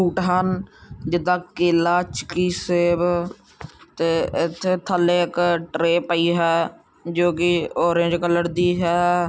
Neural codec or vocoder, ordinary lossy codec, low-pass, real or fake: none; none; none; real